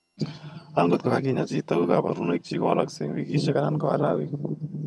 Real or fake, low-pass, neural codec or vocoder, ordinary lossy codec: fake; none; vocoder, 22.05 kHz, 80 mel bands, HiFi-GAN; none